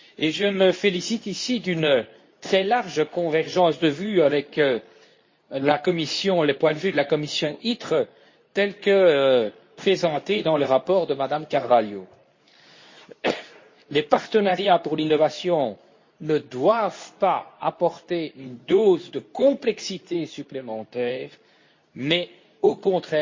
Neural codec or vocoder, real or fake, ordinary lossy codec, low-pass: codec, 24 kHz, 0.9 kbps, WavTokenizer, medium speech release version 2; fake; MP3, 32 kbps; 7.2 kHz